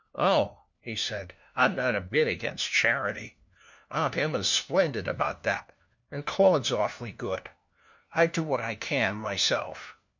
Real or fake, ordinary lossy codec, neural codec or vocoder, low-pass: fake; MP3, 64 kbps; codec, 16 kHz, 1 kbps, FunCodec, trained on LibriTTS, 50 frames a second; 7.2 kHz